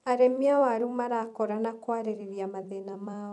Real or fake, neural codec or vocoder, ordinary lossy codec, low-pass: fake; vocoder, 44.1 kHz, 128 mel bands every 256 samples, BigVGAN v2; none; 10.8 kHz